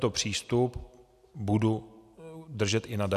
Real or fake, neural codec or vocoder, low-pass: real; none; 14.4 kHz